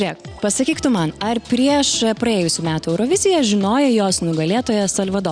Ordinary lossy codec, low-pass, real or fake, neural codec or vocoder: Opus, 64 kbps; 9.9 kHz; real; none